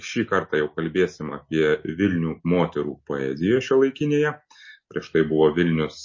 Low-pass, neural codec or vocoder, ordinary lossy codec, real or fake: 7.2 kHz; none; MP3, 32 kbps; real